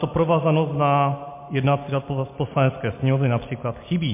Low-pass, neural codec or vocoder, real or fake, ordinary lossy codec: 3.6 kHz; none; real; MP3, 24 kbps